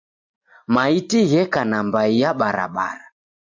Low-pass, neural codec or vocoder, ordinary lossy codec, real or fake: 7.2 kHz; none; MP3, 64 kbps; real